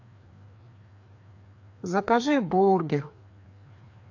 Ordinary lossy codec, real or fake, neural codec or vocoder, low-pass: AAC, 48 kbps; fake; codec, 16 kHz, 2 kbps, FreqCodec, larger model; 7.2 kHz